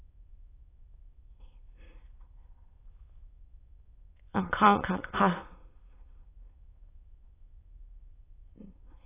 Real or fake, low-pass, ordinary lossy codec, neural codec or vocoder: fake; 3.6 kHz; AAC, 16 kbps; autoencoder, 22.05 kHz, a latent of 192 numbers a frame, VITS, trained on many speakers